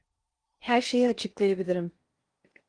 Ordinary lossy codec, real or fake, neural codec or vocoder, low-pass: Opus, 24 kbps; fake; codec, 16 kHz in and 24 kHz out, 0.8 kbps, FocalCodec, streaming, 65536 codes; 9.9 kHz